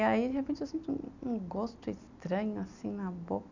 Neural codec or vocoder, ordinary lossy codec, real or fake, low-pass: none; none; real; 7.2 kHz